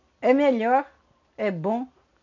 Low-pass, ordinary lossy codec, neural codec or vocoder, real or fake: 7.2 kHz; none; none; real